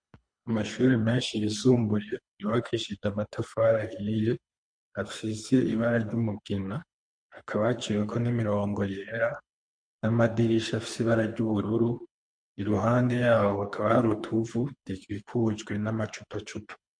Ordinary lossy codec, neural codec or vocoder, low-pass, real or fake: MP3, 48 kbps; codec, 24 kHz, 3 kbps, HILCodec; 9.9 kHz; fake